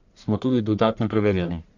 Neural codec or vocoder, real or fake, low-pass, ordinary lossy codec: codec, 44.1 kHz, 1.7 kbps, Pupu-Codec; fake; 7.2 kHz; Opus, 64 kbps